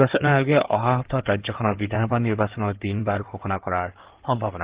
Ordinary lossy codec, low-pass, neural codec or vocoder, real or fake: Opus, 16 kbps; 3.6 kHz; codec, 16 kHz in and 24 kHz out, 2.2 kbps, FireRedTTS-2 codec; fake